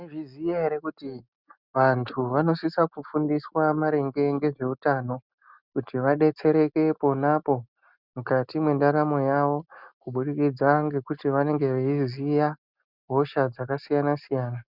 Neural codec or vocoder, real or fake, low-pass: none; real; 5.4 kHz